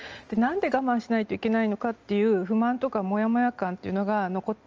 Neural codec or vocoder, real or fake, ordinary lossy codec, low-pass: none; real; Opus, 24 kbps; 7.2 kHz